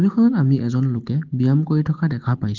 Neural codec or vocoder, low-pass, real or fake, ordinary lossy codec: none; 7.2 kHz; real; Opus, 16 kbps